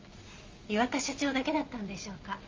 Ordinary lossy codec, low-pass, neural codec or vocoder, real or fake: Opus, 32 kbps; 7.2 kHz; none; real